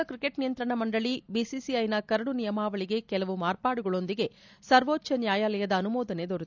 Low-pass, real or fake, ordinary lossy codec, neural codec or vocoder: 7.2 kHz; real; none; none